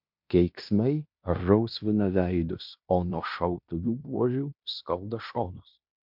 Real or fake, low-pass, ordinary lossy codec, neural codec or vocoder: fake; 5.4 kHz; MP3, 48 kbps; codec, 16 kHz in and 24 kHz out, 0.9 kbps, LongCat-Audio-Codec, fine tuned four codebook decoder